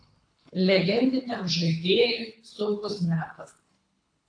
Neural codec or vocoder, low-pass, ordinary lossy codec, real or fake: codec, 24 kHz, 3 kbps, HILCodec; 9.9 kHz; AAC, 48 kbps; fake